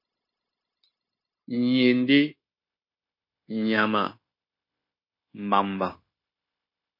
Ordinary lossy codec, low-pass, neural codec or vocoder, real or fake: MP3, 32 kbps; 5.4 kHz; codec, 16 kHz, 0.9 kbps, LongCat-Audio-Codec; fake